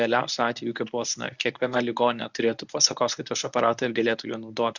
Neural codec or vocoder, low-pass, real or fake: codec, 24 kHz, 0.9 kbps, WavTokenizer, medium speech release version 1; 7.2 kHz; fake